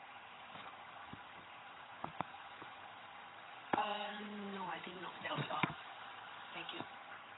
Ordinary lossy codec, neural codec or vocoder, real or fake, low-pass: AAC, 16 kbps; vocoder, 22.05 kHz, 80 mel bands, HiFi-GAN; fake; 7.2 kHz